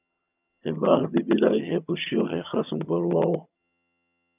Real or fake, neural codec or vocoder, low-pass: fake; vocoder, 22.05 kHz, 80 mel bands, HiFi-GAN; 3.6 kHz